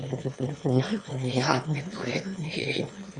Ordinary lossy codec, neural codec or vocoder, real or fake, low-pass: Opus, 64 kbps; autoencoder, 22.05 kHz, a latent of 192 numbers a frame, VITS, trained on one speaker; fake; 9.9 kHz